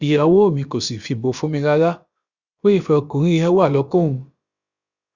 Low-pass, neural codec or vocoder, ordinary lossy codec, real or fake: 7.2 kHz; codec, 16 kHz, about 1 kbps, DyCAST, with the encoder's durations; Opus, 64 kbps; fake